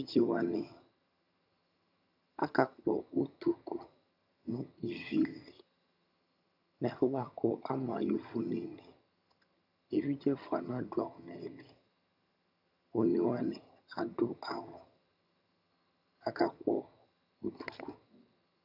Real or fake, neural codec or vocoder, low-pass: fake; vocoder, 22.05 kHz, 80 mel bands, HiFi-GAN; 5.4 kHz